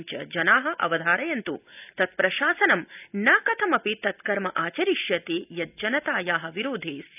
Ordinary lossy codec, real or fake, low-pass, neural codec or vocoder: none; real; 3.6 kHz; none